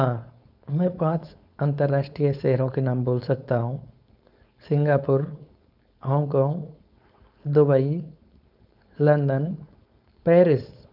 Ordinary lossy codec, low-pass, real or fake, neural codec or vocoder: none; 5.4 kHz; fake; codec, 16 kHz, 4.8 kbps, FACodec